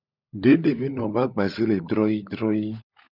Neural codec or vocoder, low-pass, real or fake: codec, 16 kHz, 16 kbps, FunCodec, trained on LibriTTS, 50 frames a second; 5.4 kHz; fake